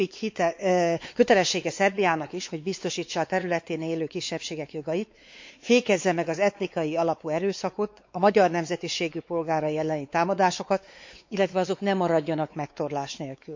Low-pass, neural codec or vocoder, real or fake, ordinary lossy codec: 7.2 kHz; codec, 16 kHz, 4 kbps, X-Codec, WavLM features, trained on Multilingual LibriSpeech; fake; MP3, 48 kbps